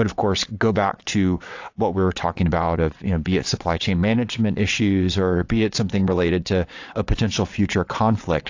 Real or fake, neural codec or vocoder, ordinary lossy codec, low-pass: fake; vocoder, 22.05 kHz, 80 mel bands, WaveNeXt; AAC, 48 kbps; 7.2 kHz